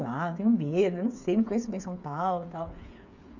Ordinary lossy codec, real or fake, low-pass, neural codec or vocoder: none; fake; 7.2 kHz; codec, 16 kHz, 8 kbps, FreqCodec, smaller model